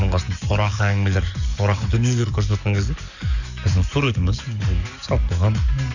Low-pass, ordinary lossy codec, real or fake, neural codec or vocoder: 7.2 kHz; none; fake; codec, 44.1 kHz, 7.8 kbps, DAC